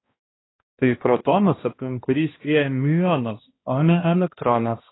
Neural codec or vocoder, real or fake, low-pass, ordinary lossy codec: codec, 16 kHz, 1 kbps, X-Codec, HuBERT features, trained on balanced general audio; fake; 7.2 kHz; AAC, 16 kbps